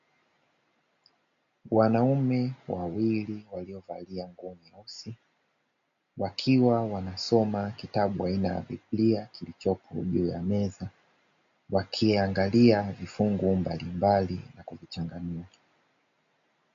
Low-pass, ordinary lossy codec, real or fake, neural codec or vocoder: 7.2 kHz; MP3, 48 kbps; real; none